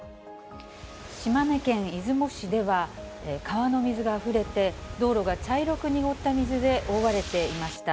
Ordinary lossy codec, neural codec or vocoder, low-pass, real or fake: none; none; none; real